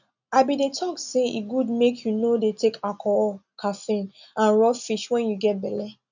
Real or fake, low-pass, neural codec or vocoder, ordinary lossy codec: real; 7.2 kHz; none; none